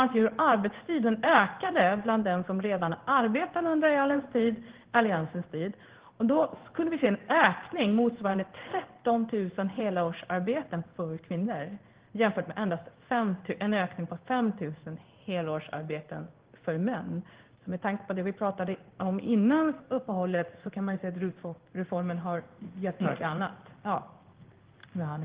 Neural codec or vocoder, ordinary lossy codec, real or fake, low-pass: codec, 16 kHz in and 24 kHz out, 1 kbps, XY-Tokenizer; Opus, 16 kbps; fake; 3.6 kHz